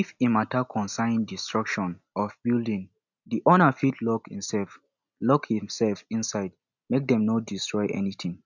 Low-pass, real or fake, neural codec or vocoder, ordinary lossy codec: 7.2 kHz; real; none; none